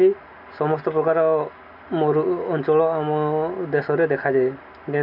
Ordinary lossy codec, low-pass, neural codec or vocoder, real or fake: none; 5.4 kHz; none; real